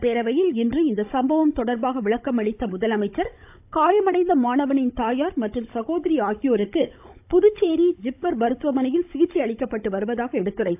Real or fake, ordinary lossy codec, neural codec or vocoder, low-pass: fake; AAC, 32 kbps; codec, 16 kHz, 16 kbps, FunCodec, trained on Chinese and English, 50 frames a second; 3.6 kHz